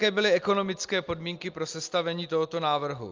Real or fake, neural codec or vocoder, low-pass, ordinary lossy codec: real; none; 7.2 kHz; Opus, 24 kbps